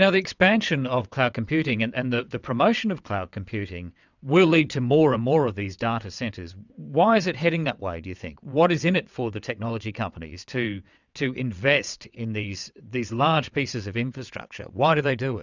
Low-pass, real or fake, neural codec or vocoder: 7.2 kHz; fake; vocoder, 22.05 kHz, 80 mel bands, WaveNeXt